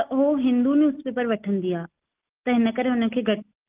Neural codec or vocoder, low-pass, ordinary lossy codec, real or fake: none; 3.6 kHz; Opus, 32 kbps; real